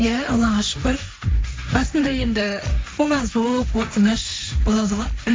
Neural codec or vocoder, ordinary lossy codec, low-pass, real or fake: codec, 16 kHz, 1.1 kbps, Voila-Tokenizer; none; none; fake